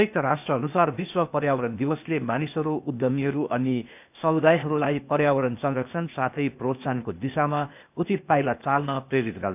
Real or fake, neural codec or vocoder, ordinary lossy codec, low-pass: fake; codec, 16 kHz, 0.8 kbps, ZipCodec; none; 3.6 kHz